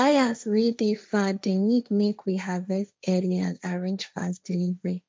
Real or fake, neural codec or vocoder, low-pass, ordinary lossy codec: fake; codec, 16 kHz, 1.1 kbps, Voila-Tokenizer; none; none